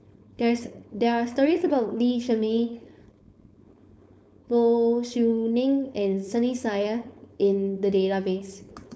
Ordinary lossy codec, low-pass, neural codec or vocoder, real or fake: none; none; codec, 16 kHz, 4.8 kbps, FACodec; fake